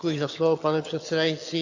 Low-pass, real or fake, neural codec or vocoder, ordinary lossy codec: 7.2 kHz; fake; vocoder, 22.05 kHz, 80 mel bands, HiFi-GAN; AAC, 48 kbps